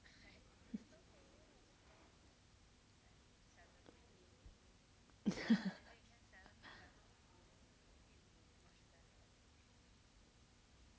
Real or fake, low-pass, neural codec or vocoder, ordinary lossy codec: real; none; none; none